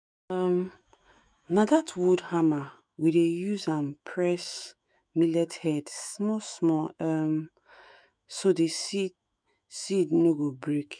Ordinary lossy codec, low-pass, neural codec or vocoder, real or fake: none; 9.9 kHz; autoencoder, 48 kHz, 128 numbers a frame, DAC-VAE, trained on Japanese speech; fake